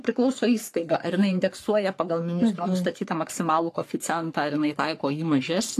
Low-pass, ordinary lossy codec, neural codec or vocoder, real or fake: 14.4 kHz; AAC, 64 kbps; codec, 44.1 kHz, 3.4 kbps, Pupu-Codec; fake